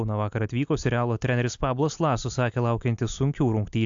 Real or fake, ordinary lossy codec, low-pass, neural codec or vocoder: real; AAC, 64 kbps; 7.2 kHz; none